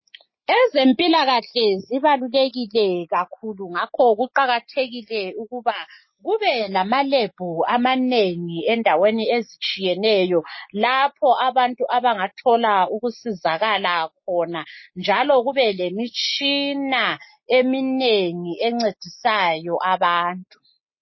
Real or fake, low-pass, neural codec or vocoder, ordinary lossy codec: real; 7.2 kHz; none; MP3, 24 kbps